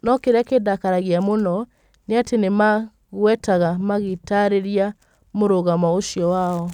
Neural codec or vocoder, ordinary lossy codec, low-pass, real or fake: none; none; 19.8 kHz; real